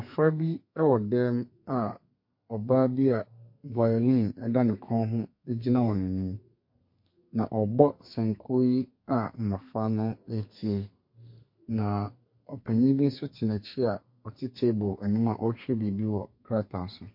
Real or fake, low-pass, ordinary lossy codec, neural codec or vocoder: fake; 5.4 kHz; MP3, 32 kbps; codec, 32 kHz, 1.9 kbps, SNAC